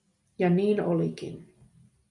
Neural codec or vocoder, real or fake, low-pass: none; real; 10.8 kHz